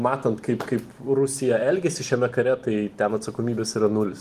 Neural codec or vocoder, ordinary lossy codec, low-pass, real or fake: none; Opus, 24 kbps; 14.4 kHz; real